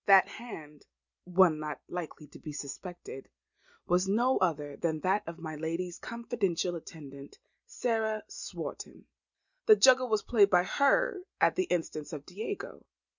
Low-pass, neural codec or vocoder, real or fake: 7.2 kHz; none; real